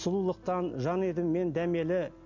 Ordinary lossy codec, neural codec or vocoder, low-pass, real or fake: none; none; 7.2 kHz; real